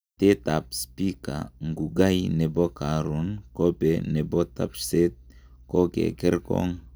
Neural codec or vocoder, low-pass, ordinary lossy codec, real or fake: none; none; none; real